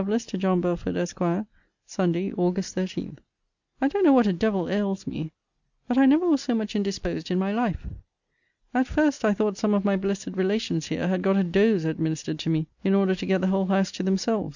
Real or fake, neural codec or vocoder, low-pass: real; none; 7.2 kHz